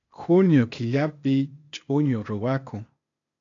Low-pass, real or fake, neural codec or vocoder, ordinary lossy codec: 7.2 kHz; fake; codec, 16 kHz, 0.8 kbps, ZipCodec; AAC, 64 kbps